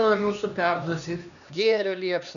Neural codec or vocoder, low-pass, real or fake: codec, 16 kHz, 2 kbps, X-Codec, HuBERT features, trained on LibriSpeech; 7.2 kHz; fake